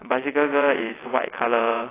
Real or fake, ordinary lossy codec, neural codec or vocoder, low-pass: fake; AAC, 16 kbps; vocoder, 22.05 kHz, 80 mel bands, WaveNeXt; 3.6 kHz